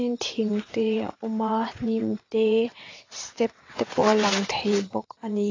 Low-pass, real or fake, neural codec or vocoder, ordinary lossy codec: 7.2 kHz; fake; vocoder, 22.05 kHz, 80 mel bands, WaveNeXt; AAC, 32 kbps